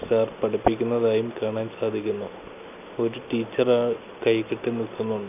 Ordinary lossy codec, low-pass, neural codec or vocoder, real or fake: none; 3.6 kHz; none; real